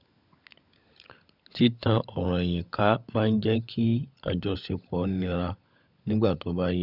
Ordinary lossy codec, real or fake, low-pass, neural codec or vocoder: none; fake; 5.4 kHz; codec, 16 kHz, 16 kbps, FunCodec, trained on LibriTTS, 50 frames a second